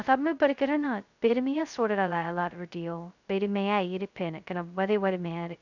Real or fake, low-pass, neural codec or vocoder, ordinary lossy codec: fake; 7.2 kHz; codec, 16 kHz, 0.2 kbps, FocalCodec; none